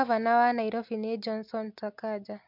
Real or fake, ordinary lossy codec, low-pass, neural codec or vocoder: real; MP3, 48 kbps; 5.4 kHz; none